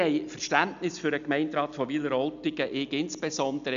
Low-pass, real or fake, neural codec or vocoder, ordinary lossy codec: 7.2 kHz; real; none; none